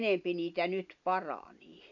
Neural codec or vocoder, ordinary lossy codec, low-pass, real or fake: none; none; 7.2 kHz; real